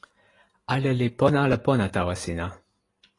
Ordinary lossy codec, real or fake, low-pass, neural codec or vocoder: AAC, 32 kbps; fake; 10.8 kHz; vocoder, 44.1 kHz, 128 mel bands every 512 samples, BigVGAN v2